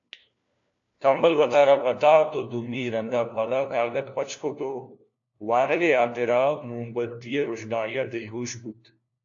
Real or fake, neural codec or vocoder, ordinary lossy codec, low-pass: fake; codec, 16 kHz, 1 kbps, FunCodec, trained on LibriTTS, 50 frames a second; AAC, 64 kbps; 7.2 kHz